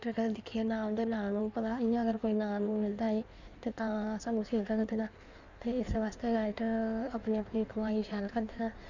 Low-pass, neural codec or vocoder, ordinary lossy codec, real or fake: 7.2 kHz; codec, 16 kHz in and 24 kHz out, 1.1 kbps, FireRedTTS-2 codec; none; fake